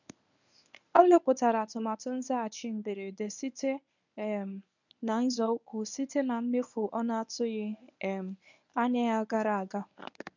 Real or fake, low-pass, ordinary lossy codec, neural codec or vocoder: fake; 7.2 kHz; none; codec, 24 kHz, 0.9 kbps, WavTokenizer, medium speech release version 1